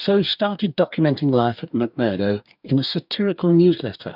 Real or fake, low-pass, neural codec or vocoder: fake; 5.4 kHz; codec, 44.1 kHz, 2.6 kbps, DAC